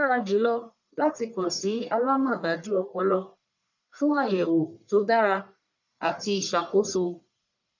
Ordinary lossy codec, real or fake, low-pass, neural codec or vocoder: none; fake; 7.2 kHz; codec, 44.1 kHz, 1.7 kbps, Pupu-Codec